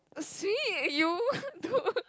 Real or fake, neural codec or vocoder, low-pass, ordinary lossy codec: real; none; none; none